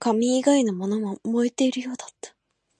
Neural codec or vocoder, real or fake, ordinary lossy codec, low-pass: none; real; MP3, 96 kbps; 9.9 kHz